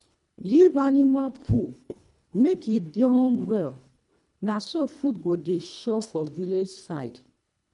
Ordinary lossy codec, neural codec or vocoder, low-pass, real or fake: MP3, 64 kbps; codec, 24 kHz, 1.5 kbps, HILCodec; 10.8 kHz; fake